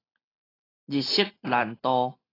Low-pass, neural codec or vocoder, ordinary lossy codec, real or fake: 5.4 kHz; none; AAC, 24 kbps; real